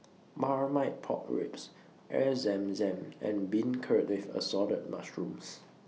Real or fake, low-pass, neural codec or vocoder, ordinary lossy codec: real; none; none; none